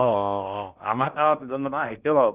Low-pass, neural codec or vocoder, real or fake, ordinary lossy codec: 3.6 kHz; codec, 16 kHz in and 24 kHz out, 0.6 kbps, FocalCodec, streaming, 2048 codes; fake; Opus, 32 kbps